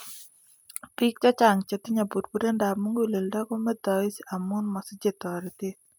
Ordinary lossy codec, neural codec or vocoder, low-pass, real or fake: none; none; none; real